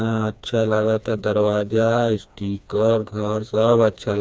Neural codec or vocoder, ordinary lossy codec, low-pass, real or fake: codec, 16 kHz, 2 kbps, FreqCodec, smaller model; none; none; fake